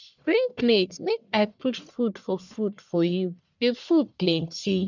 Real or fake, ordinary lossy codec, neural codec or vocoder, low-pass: fake; none; codec, 44.1 kHz, 1.7 kbps, Pupu-Codec; 7.2 kHz